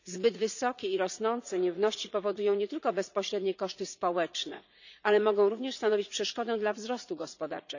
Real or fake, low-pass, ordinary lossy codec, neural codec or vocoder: fake; 7.2 kHz; none; vocoder, 44.1 kHz, 80 mel bands, Vocos